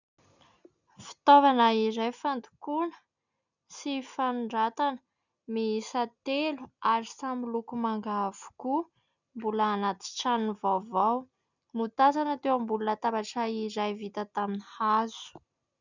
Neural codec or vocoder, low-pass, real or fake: none; 7.2 kHz; real